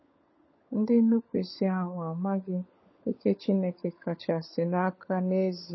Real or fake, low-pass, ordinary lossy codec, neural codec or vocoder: fake; 7.2 kHz; MP3, 24 kbps; codec, 16 kHz, 16 kbps, FunCodec, trained on LibriTTS, 50 frames a second